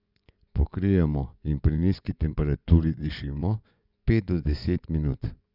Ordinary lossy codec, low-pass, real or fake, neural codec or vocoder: none; 5.4 kHz; fake; vocoder, 24 kHz, 100 mel bands, Vocos